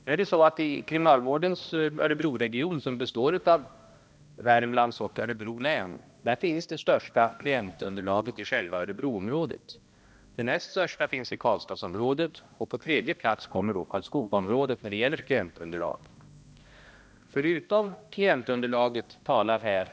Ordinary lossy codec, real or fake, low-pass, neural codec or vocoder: none; fake; none; codec, 16 kHz, 1 kbps, X-Codec, HuBERT features, trained on balanced general audio